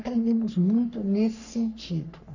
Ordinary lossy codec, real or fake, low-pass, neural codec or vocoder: none; fake; 7.2 kHz; codec, 44.1 kHz, 3.4 kbps, Pupu-Codec